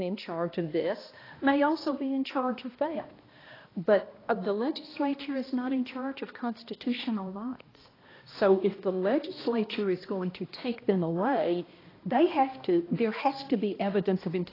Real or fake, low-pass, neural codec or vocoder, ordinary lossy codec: fake; 5.4 kHz; codec, 16 kHz, 1 kbps, X-Codec, HuBERT features, trained on balanced general audio; AAC, 24 kbps